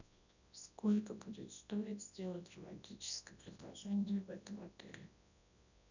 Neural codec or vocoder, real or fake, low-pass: codec, 24 kHz, 0.9 kbps, WavTokenizer, large speech release; fake; 7.2 kHz